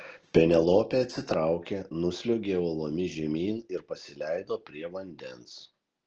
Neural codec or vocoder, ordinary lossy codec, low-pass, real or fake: none; Opus, 16 kbps; 7.2 kHz; real